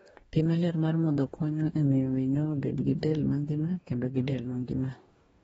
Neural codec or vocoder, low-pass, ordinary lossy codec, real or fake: codec, 44.1 kHz, 2.6 kbps, DAC; 19.8 kHz; AAC, 24 kbps; fake